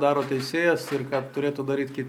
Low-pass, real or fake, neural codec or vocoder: 19.8 kHz; real; none